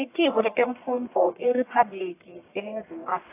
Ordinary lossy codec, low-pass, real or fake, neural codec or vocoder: AAC, 24 kbps; 3.6 kHz; fake; codec, 44.1 kHz, 1.7 kbps, Pupu-Codec